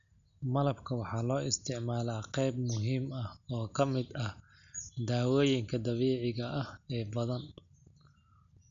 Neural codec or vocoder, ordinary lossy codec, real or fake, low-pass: none; none; real; 7.2 kHz